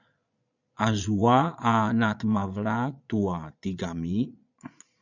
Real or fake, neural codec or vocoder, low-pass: fake; vocoder, 22.05 kHz, 80 mel bands, Vocos; 7.2 kHz